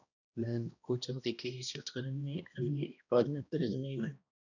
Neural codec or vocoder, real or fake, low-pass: codec, 16 kHz, 1 kbps, X-Codec, HuBERT features, trained on balanced general audio; fake; 7.2 kHz